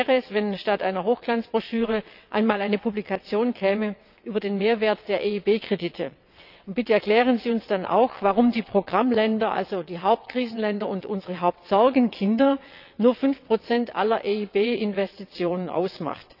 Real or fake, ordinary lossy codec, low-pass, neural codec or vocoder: fake; none; 5.4 kHz; vocoder, 22.05 kHz, 80 mel bands, WaveNeXt